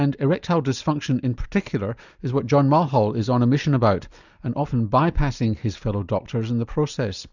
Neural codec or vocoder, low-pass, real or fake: none; 7.2 kHz; real